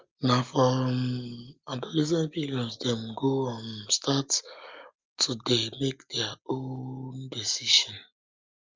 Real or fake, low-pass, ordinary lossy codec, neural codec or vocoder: real; 7.2 kHz; Opus, 32 kbps; none